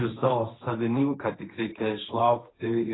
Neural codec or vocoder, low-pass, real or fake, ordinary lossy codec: codec, 16 kHz, 1.1 kbps, Voila-Tokenizer; 7.2 kHz; fake; AAC, 16 kbps